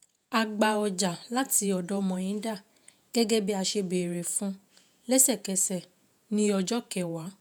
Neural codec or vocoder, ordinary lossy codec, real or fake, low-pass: vocoder, 48 kHz, 128 mel bands, Vocos; none; fake; none